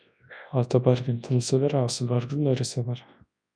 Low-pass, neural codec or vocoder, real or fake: 9.9 kHz; codec, 24 kHz, 0.9 kbps, WavTokenizer, large speech release; fake